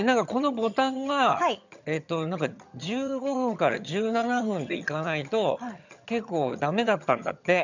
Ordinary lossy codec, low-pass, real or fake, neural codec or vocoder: none; 7.2 kHz; fake; vocoder, 22.05 kHz, 80 mel bands, HiFi-GAN